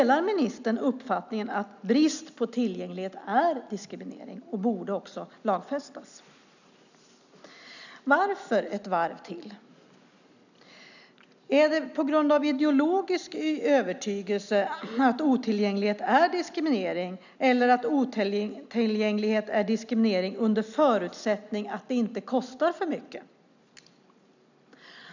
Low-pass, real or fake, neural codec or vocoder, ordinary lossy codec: 7.2 kHz; real; none; none